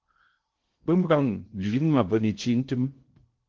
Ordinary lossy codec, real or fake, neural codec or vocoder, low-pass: Opus, 16 kbps; fake; codec, 16 kHz in and 24 kHz out, 0.6 kbps, FocalCodec, streaming, 2048 codes; 7.2 kHz